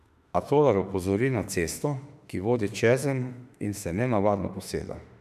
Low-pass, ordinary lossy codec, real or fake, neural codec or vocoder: 14.4 kHz; none; fake; autoencoder, 48 kHz, 32 numbers a frame, DAC-VAE, trained on Japanese speech